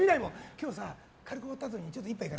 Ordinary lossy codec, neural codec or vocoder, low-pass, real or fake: none; none; none; real